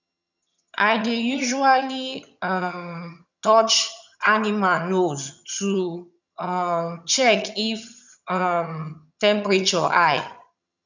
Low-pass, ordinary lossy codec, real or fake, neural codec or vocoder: 7.2 kHz; none; fake; vocoder, 22.05 kHz, 80 mel bands, HiFi-GAN